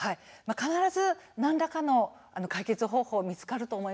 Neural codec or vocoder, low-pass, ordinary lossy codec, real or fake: none; none; none; real